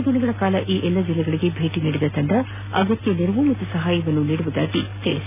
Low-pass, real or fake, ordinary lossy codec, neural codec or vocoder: 3.6 kHz; real; AAC, 32 kbps; none